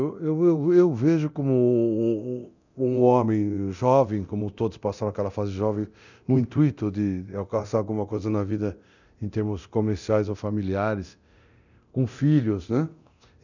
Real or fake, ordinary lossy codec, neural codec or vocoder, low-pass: fake; none; codec, 24 kHz, 0.9 kbps, DualCodec; 7.2 kHz